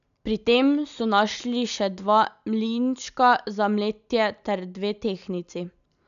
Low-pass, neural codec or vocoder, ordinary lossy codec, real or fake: 7.2 kHz; none; MP3, 96 kbps; real